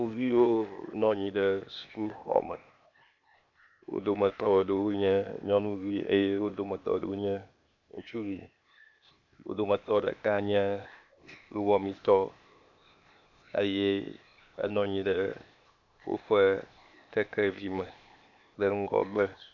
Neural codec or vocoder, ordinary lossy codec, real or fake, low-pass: codec, 16 kHz, 0.8 kbps, ZipCodec; MP3, 64 kbps; fake; 7.2 kHz